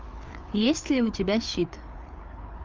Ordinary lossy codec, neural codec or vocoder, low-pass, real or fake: Opus, 24 kbps; codec, 16 kHz, 4 kbps, FreqCodec, larger model; 7.2 kHz; fake